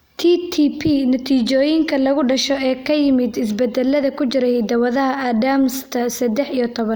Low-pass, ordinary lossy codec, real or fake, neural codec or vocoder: none; none; real; none